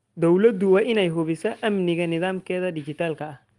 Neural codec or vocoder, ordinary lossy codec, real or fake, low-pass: none; Opus, 24 kbps; real; 10.8 kHz